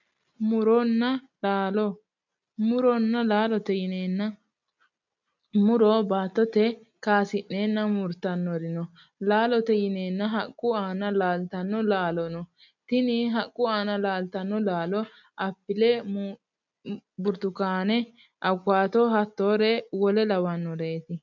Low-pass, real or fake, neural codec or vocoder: 7.2 kHz; real; none